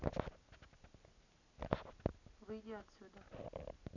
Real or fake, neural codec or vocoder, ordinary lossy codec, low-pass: real; none; none; 7.2 kHz